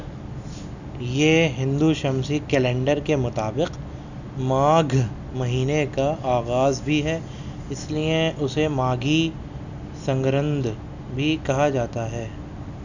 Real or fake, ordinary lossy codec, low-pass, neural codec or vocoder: real; none; 7.2 kHz; none